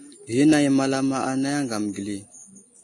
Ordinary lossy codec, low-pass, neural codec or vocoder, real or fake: AAC, 48 kbps; 10.8 kHz; none; real